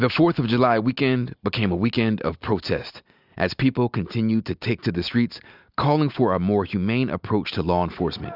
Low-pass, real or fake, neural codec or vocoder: 5.4 kHz; real; none